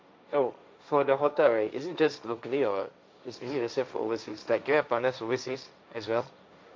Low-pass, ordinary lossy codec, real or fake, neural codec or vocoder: none; none; fake; codec, 16 kHz, 1.1 kbps, Voila-Tokenizer